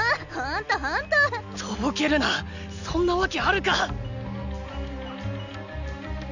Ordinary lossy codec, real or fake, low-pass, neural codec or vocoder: none; real; 7.2 kHz; none